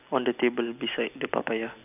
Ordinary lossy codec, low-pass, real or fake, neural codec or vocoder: none; 3.6 kHz; real; none